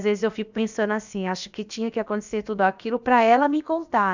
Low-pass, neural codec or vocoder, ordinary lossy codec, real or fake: 7.2 kHz; codec, 16 kHz, about 1 kbps, DyCAST, with the encoder's durations; none; fake